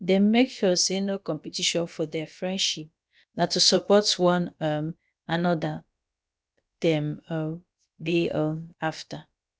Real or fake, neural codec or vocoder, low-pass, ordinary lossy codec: fake; codec, 16 kHz, about 1 kbps, DyCAST, with the encoder's durations; none; none